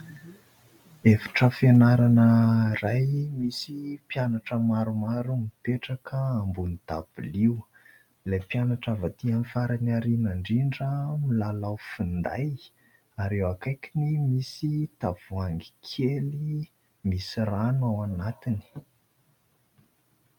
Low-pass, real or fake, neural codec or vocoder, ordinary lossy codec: 19.8 kHz; real; none; MP3, 96 kbps